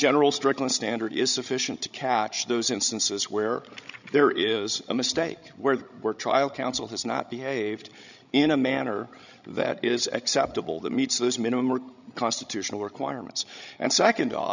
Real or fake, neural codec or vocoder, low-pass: fake; codec, 16 kHz, 16 kbps, FreqCodec, larger model; 7.2 kHz